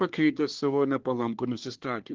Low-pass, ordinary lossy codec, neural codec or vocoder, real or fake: 7.2 kHz; Opus, 16 kbps; codec, 24 kHz, 1 kbps, SNAC; fake